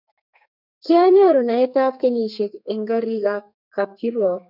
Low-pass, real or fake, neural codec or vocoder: 5.4 kHz; fake; codec, 32 kHz, 1.9 kbps, SNAC